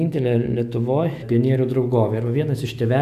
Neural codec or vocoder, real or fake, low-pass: autoencoder, 48 kHz, 128 numbers a frame, DAC-VAE, trained on Japanese speech; fake; 14.4 kHz